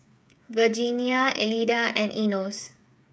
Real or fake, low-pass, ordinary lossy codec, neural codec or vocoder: fake; none; none; codec, 16 kHz, 8 kbps, FreqCodec, smaller model